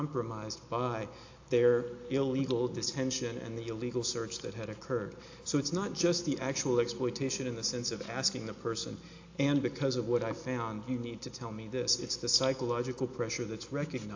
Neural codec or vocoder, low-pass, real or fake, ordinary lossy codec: none; 7.2 kHz; real; AAC, 48 kbps